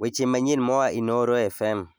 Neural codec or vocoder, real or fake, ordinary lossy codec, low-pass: none; real; none; none